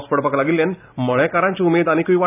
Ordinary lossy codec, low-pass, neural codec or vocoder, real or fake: none; 3.6 kHz; none; real